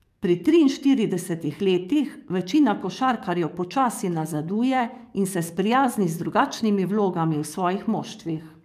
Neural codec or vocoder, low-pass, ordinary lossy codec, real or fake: codec, 44.1 kHz, 7.8 kbps, DAC; 14.4 kHz; MP3, 96 kbps; fake